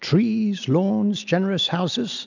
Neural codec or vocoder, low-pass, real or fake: none; 7.2 kHz; real